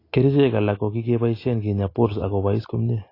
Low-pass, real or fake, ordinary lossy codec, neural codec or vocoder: 5.4 kHz; real; AAC, 24 kbps; none